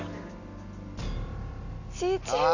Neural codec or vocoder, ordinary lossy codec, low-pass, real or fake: none; none; 7.2 kHz; real